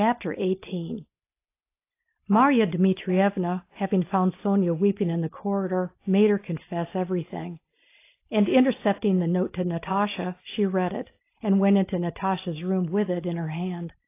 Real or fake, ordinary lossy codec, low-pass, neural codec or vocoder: real; AAC, 24 kbps; 3.6 kHz; none